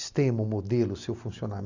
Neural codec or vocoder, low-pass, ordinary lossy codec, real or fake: none; 7.2 kHz; none; real